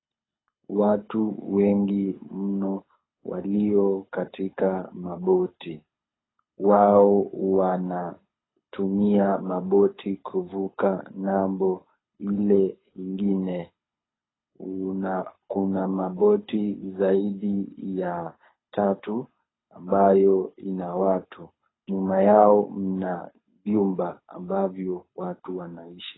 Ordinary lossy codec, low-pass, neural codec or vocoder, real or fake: AAC, 16 kbps; 7.2 kHz; codec, 24 kHz, 6 kbps, HILCodec; fake